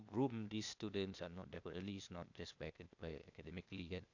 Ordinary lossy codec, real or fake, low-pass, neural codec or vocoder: none; fake; 7.2 kHz; codec, 16 kHz, 0.8 kbps, ZipCodec